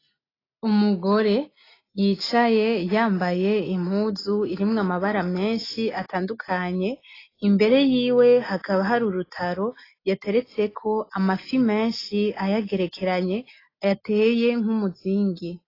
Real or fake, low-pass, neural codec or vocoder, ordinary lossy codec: real; 5.4 kHz; none; AAC, 24 kbps